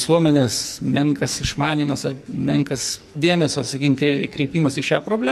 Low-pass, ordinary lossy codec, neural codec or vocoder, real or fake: 14.4 kHz; MP3, 64 kbps; codec, 44.1 kHz, 2.6 kbps, SNAC; fake